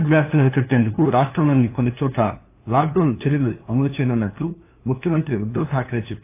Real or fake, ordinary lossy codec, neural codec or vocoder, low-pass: fake; AAC, 24 kbps; codec, 16 kHz, 2 kbps, FunCodec, trained on LibriTTS, 25 frames a second; 3.6 kHz